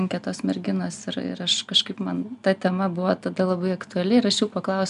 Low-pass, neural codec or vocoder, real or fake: 10.8 kHz; none; real